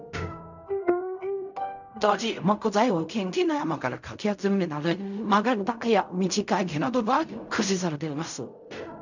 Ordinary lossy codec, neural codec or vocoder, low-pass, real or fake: none; codec, 16 kHz in and 24 kHz out, 0.4 kbps, LongCat-Audio-Codec, fine tuned four codebook decoder; 7.2 kHz; fake